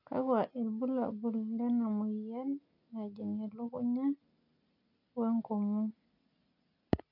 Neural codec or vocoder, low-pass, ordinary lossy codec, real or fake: none; 5.4 kHz; none; real